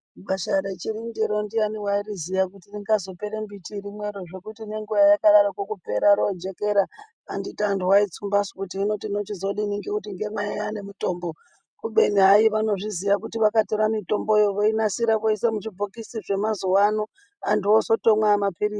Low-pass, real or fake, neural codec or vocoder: 9.9 kHz; real; none